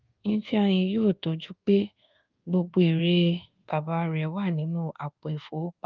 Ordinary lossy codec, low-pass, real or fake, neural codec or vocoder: Opus, 32 kbps; 7.2 kHz; fake; codec, 24 kHz, 1.2 kbps, DualCodec